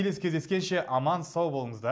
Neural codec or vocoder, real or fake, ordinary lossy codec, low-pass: none; real; none; none